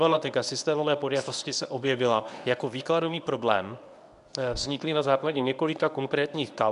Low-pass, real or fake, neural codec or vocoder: 10.8 kHz; fake; codec, 24 kHz, 0.9 kbps, WavTokenizer, medium speech release version 1